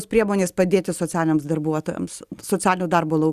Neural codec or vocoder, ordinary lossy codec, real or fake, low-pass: none; Opus, 64 kbps; real; 14.4 kHz